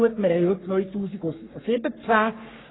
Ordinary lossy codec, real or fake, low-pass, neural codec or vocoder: AAC, 16 kbps; fake; 7.2 kHz; codec, 44.1 kHz, 3.4 kbps, Pupu-Codec